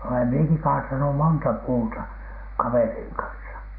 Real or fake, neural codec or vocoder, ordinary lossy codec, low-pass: real; none; none; 5.4 kHz